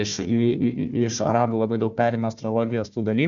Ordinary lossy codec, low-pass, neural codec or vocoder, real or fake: MP3, 96 kbps; 7.2 kHz; codec, 16 kHz, 1 kbps, FunCodec, trained on Chinese and English, 50 frames a second; fake